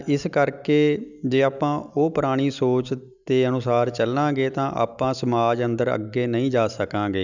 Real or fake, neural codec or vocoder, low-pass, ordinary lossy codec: real; none; 7.2 kHz; none